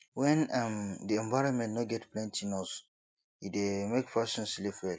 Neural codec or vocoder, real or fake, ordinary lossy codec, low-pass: none; real; none; none